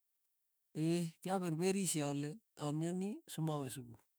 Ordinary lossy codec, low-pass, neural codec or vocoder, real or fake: none; none; autoencoder, 48 kHz, 32 numbers a frame, DAC-VAE, trained on Japanese speech; fake